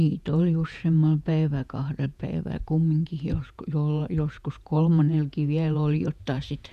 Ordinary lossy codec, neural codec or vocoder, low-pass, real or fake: none; vocoder, 48 kHz, 128 mel bands, Vocos; 14.4 kHz; fake